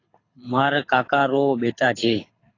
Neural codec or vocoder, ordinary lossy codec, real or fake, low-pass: codec, 24 kHz, 6 kbps, HILCodec; AAC, 32 kbps; fake; 7.2 kHz